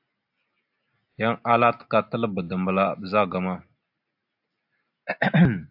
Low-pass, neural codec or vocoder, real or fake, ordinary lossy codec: 5.4 kHz; none; real; AAC, 48 kbps